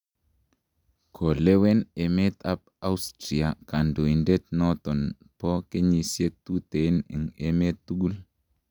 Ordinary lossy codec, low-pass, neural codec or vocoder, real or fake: none; 19.8 kHz; none; real